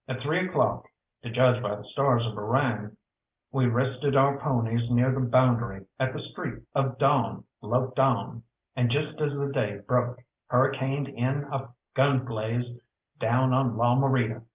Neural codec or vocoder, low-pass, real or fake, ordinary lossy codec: none; 3.6 kHz; real; Opus, 24 kbps